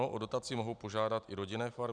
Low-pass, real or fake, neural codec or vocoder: 9.9 kHz; real; none